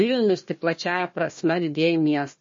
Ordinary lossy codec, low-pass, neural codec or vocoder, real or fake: MP3, 32 kbps; 7.2 kHz; codec, 16 kHz, 1 kbps, FunCodec, trained on Chinese and English, 50 frames a second; fake